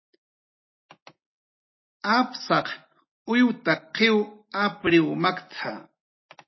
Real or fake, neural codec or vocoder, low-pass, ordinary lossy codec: real; none; 7.2 kHz; MP3, 24 kbps